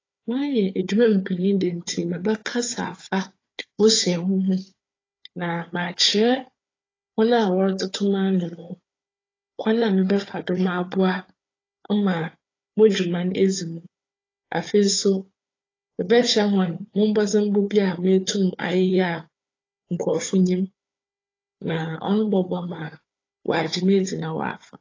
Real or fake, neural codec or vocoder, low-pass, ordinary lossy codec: fake; codec, 16 kHz, 4 kbps, FunCodec, trained on Chinese and English, 50 frames a second; 7.2 kHz; AAC, 32 kbps